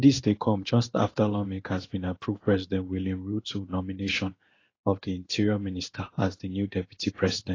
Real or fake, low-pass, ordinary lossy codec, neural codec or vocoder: fake; 7.2 kHz; AAC, 32 kbps; codec, 16 kHz in and 24 kHz out, 1 kbps, XY-Tokenizer